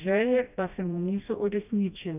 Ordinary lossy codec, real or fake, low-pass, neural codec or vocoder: none; fake; 3.6 kHz; codec, 16 kHz, 1 kbps, FreqCodec, smaller model